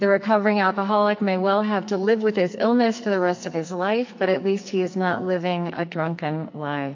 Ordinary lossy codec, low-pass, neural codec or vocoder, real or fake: MP3, 48 kbps; 7.2 kHz; codec, 44.1 kHz, 2.6 kbps, SNAC; fake